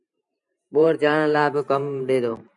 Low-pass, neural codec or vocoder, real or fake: 10.8 kHz; vocoder, 44.1 kHz, 128 mel bands every 512 samples, BigVGAN v2; fake